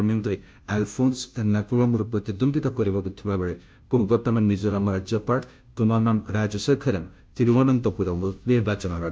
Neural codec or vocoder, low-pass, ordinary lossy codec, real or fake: codec, 16 kHz, 0.5 kbps, FunCodec, trained on Chinese and English, 25 frames a second; none; none; fake